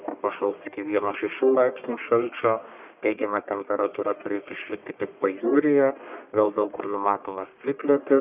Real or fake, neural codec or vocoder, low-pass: fake; codec, 44.1 kHz, 1.7 kbps, Pupu-Codec; 3.6 kHz